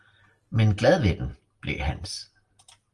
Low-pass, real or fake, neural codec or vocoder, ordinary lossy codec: 10.8 kHz; real; none; Opus, 24 kbps